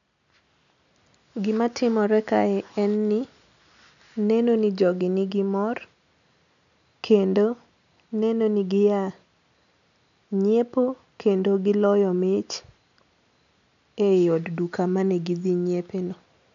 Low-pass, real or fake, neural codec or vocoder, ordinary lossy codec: 7.2 kHz; real; none; none